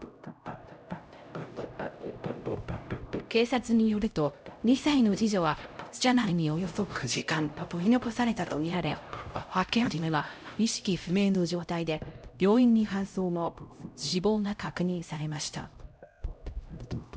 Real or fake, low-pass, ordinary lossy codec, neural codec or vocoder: fake; none; none; codec, 16 kHz, 0.5 kbps, X-Codec, HuBERT features, trained on LibriSpeech